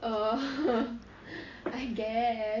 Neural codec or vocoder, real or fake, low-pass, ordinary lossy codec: none; real; 7.2 kHz; AAC, 32 kbps